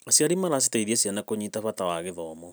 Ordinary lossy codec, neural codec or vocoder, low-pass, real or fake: none; none; none; real